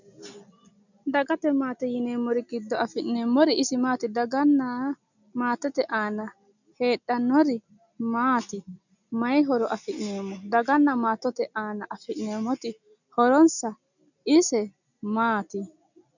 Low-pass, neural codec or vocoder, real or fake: 7.2 kHz; none; real